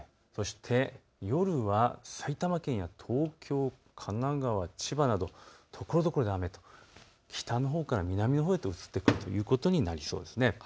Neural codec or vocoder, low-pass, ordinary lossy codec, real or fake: none; none; none; real